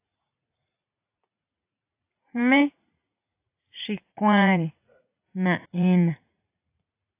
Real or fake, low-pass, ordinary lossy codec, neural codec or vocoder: fake; 3.6 kHz; AAC, 24 kbps; vocoder, 44.1 kHz, 80 mel bands, Vocos